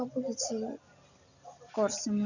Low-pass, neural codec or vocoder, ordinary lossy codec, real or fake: 7.2 kHz; none; none; real